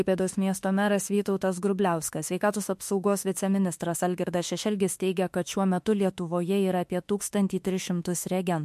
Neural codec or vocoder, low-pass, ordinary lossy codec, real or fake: autoencoder, 48 kHz, 32 numbers a frame, DAC-VAE, trained on Japanese speech; 14.4 kHz; MP3, 64 kbps; fake